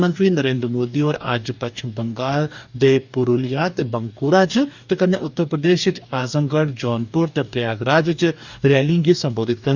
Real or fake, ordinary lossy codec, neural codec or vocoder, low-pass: fake; none; codec, 44.1 kHz, 2.6 kbps, DAC; 7.2 kHz